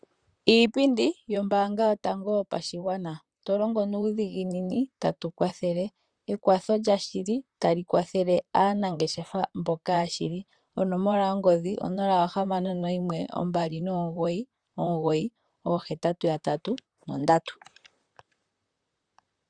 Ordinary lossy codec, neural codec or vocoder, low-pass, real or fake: Opus, 64 kbps; vocoder, 44.1 kHz, 128 mel bands, Pupu-Vocoder; 9.9 kHz; fake